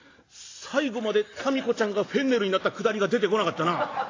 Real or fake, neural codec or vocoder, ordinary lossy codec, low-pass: real; none; AAC, 32 kbps; 7.2 kHz